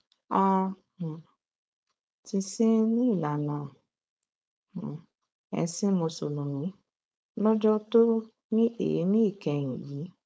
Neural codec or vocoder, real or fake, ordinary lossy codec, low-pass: codec, 16 kHz, 4.8 kbps, FACodec; fake; none; none